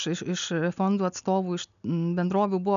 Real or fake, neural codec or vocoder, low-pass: real; none; 7.2 kHz